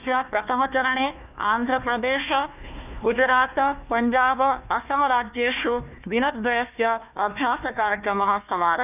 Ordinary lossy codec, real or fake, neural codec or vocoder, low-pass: none; fake; codec, 16 kHz, 1 kbps, FunCodec, trained on Chinese and English, 50 frames a second; 3.6 kHz